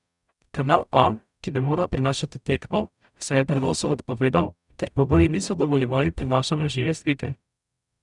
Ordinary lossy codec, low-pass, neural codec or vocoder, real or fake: none; 10.8 kHz; codec, 44.1 kHz, 0.9 kbps, DAC; fake